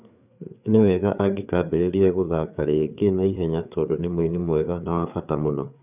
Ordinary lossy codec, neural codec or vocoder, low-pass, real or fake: none; codec, 16 kHz, 4 kbps, FreqCodec, larger model; 3.6 kHz; fake